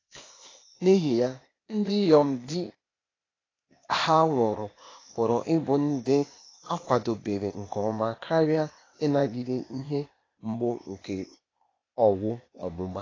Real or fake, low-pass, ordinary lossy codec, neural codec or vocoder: fake; 7.2 kHz; AAC, 32 kbps; codec, 16 kHz, 0.8 kbps, ZipCodec